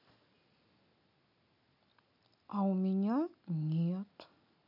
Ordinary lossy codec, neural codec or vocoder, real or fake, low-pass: none; none; real; 5.4 kHz